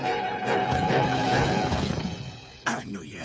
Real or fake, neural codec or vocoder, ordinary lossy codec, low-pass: fake; codec, 16 kHz, 8 kbps, FreqCodec, smaller model; none; none